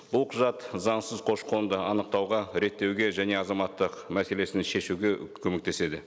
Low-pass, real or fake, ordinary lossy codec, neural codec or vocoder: none; real; none; none